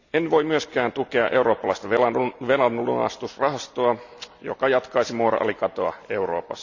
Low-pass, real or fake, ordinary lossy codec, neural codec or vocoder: 7.2 kHz; real; none; none